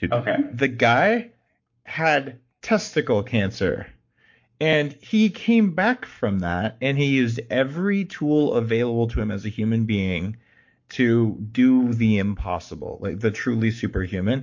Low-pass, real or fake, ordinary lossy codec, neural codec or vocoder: 7.2 kHz; fake; MP3, 48 kbps; codec, 44.1 kHz, 7.8 kbps, Pupu-Codec